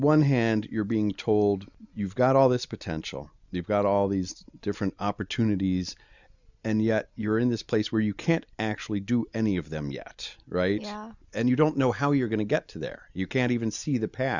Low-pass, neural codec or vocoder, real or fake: 7.2 kHz; none; real